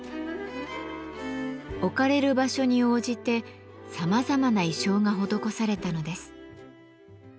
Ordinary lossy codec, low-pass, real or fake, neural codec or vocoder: none; none; real; none